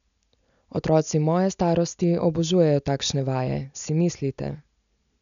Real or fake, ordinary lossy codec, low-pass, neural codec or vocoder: real; none; 7.2 kHz; none